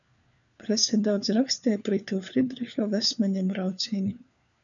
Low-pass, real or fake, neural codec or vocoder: 7.2 kHz; fake; codec, 16 kHz, 4 kbps, FunCodec, trained on LibriTTS, 50 frames a second